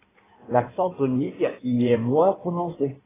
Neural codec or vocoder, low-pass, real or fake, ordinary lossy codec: codec, 16 kHz in and 24 kHz out, 1.1 kbps, FireRedTTS-2 codec; 3.6 kHz; fake; AAC, 16 kbps